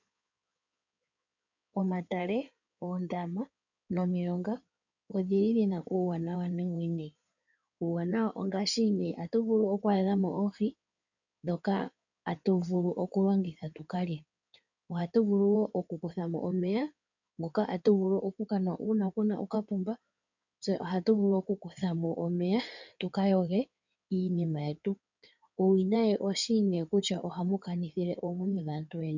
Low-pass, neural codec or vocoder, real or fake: 7.2 kHz; codec, 16 kHz in and 24 kHz out, 2.2 kbps, FireRedTTS-2 codec; fake